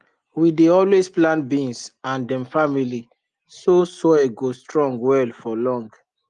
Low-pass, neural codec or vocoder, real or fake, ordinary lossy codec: 9.9 kHz; none; real; Opus, 24 kbps